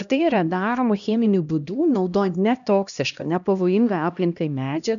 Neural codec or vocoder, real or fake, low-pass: codec, 16 kHz, 1 kbps, X-Codec, HuBERT features, trained on LibriSpeech; fake; 7.2 kHz